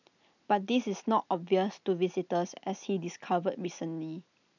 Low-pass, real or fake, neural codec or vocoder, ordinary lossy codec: 7.2 kHz; real; none; none